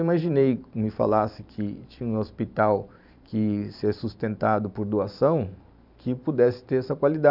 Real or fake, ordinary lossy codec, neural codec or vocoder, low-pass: fake; none; vocoder, 44.1 kHz, 128 mel bands every 512 samples, BigVGAN v2; 5.4 kHz